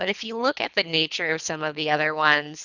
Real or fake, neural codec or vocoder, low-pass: fake; codec, 24 kHz, 3 kbps, HILCodec; 7.2 kHz